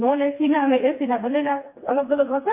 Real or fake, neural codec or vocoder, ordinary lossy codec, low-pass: fake; codec, 16 kHz, 2 kbps, FreqCodec, smaller model; MP3, 24 kbps; 3.6 kHz